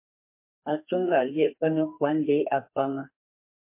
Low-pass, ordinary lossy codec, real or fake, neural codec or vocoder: 3.6 kHz; MP3, 24 kbps; fake; codec, 32 kHz, 1.9 kbps, SNAC